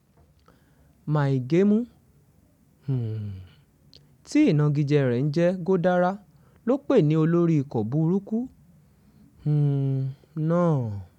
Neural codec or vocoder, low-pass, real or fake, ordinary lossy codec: none; 19.8 kHz; real; none